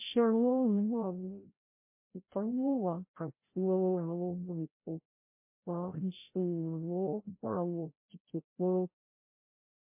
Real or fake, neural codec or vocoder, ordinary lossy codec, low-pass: fake; codec, 16 kHz, 0.5 kbps, FreqCodec, larger model; MP3, 24 kbps; 3.6 kHz